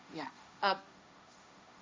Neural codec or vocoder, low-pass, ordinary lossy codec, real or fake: codec, 16 kHz, 1.1 kbps, Voila-Tokenizer; none; none; fake